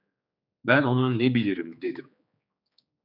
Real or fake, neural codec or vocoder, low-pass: fake; codec, 16 kHz, 4 kbps, X-Codec, HuBERT features, trained on general audio; 5.4 kHz